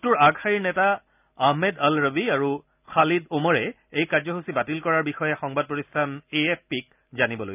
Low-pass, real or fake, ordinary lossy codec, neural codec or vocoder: 3.6 kHz; real; none; none